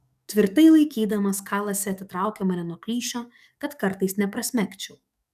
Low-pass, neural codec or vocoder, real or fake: 14.4 kHz; codec, 44.1 kHz, 7.8 kbps, DAC; fake